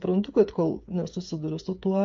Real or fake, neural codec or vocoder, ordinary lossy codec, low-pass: fake; codec, 16 kHz, 8 kbps, FreqCodec, smaller model; MP3, 48 kbps; 7.2 kHz